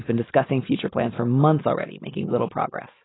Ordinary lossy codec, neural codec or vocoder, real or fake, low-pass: AAC, 16 kbps; none; real; 7.2 kHz